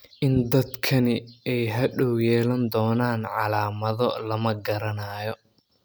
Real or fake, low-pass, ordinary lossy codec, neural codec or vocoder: real; none; none; none